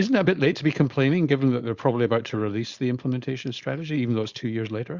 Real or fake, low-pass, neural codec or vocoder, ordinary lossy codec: fake; 7.2 kHz; codec, 16 kHz, 4.8 kbps, FACodec; Opus, 64 kbps